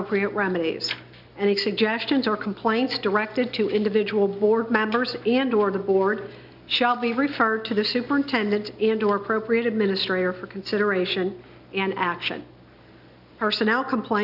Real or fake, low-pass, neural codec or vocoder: real; 5.4 kHz; none